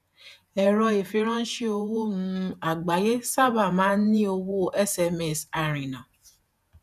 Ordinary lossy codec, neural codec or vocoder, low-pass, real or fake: none; vocoder, 48 kHz, 128 mel bands, Vocos; 14.4 kHz; fake